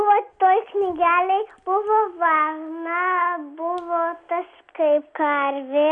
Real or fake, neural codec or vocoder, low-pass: real; none; 9.9 kHz